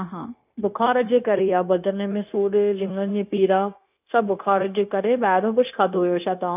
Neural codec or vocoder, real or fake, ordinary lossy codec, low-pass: codec, 16 kHz, 0.9 kbps, LongCat-Audio-Codec; fake; none; 3.6 kHz